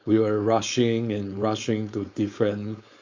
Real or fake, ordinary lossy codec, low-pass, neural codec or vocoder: fake; MP3, 48 kbps; 7.2 kHz; codec, 16 kHz, 4.8 kbps, FACodec